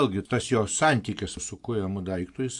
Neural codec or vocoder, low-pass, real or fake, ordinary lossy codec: none; 10.8 kHz; real; AAC, 64 kbps